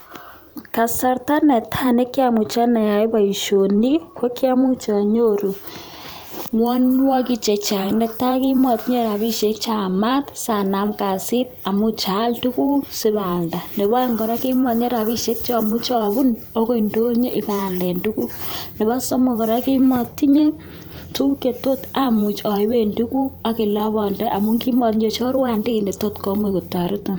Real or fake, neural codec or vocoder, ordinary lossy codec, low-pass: fake; vocoder, 44.1 kHz, 128 mel bands every 512 samples, BigVGAN v2; none; none